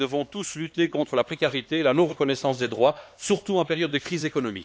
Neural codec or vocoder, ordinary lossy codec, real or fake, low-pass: codec, 16 kHz, 2 kbps, X-Codec, HuBERT features, trained on LibriSpeech; none; fake; none